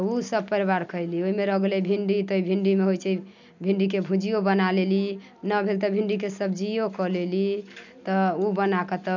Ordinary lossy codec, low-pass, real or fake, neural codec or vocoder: none; 7.2 kHz; real; none